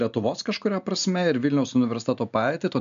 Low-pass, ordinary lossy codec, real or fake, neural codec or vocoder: 7.2 kHz; MP3, 96 kbps; real; none